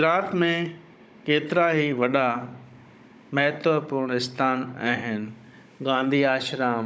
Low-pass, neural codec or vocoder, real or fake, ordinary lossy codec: none; codec, 16 kHz, 16 kbps, FunCodec, trained on Chinese and English, 50 frames a second; fake; none